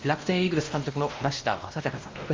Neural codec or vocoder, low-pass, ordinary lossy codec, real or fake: codec, 16 kHz, 1 kbps, X-Codec, WavLM features, trained on Multilingual LibriSpeech; 7.2 kHz; Opus, 32 kbps; fake